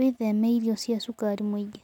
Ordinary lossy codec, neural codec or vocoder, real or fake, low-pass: none; none; real; 19.8 kHz